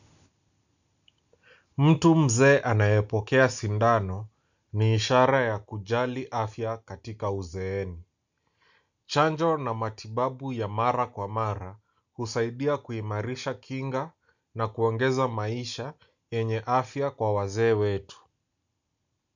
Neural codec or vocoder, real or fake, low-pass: none; real; 7.2 kHz